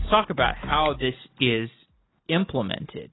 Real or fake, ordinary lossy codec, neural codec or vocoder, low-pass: real; AAC, 16 kbps; none; 7.2 kHz